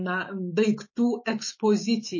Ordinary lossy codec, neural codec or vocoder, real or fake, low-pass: MP3, 32 kbps; autoencoder, 48 kHz, 128 numbers a frame, DAC-VAE, trained on Japanese speech; fake; 7.2 kHz